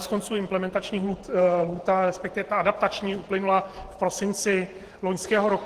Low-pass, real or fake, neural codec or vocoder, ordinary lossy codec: 14.4 kHz; fake; vocoder, 48 kHz, 128 mel bands, Vocos; Opus, 16 kbps